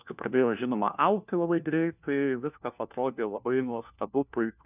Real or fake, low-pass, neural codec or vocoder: fake; 3.6 kHz; codec, 16 kHz, 1 kbps, FunCodec, trained on LibriTTS, 50 frames a second